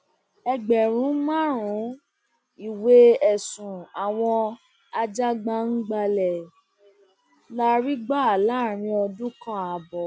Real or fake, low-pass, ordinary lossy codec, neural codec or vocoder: real; none; none; none